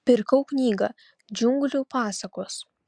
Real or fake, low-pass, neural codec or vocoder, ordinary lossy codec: real; 9.9 kHz; none; Opus, 64 kbps